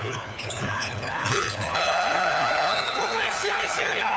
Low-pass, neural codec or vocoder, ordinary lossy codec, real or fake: none; codec, 16 kHz, 4 kbps, FunCodec, trained on LibriTTS, 50 frames a second; none; fake